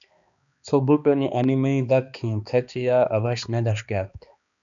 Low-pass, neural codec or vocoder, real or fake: 7.2 kHz; codec, 16 kHz, 2 kbps, X-Codec, HuBERT features, trained on balanced general audio; fake